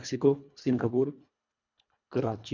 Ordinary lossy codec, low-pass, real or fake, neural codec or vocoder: none; 7.2 kHz; fake; codec, 24 kHz, 1.5 kbps, HILCodec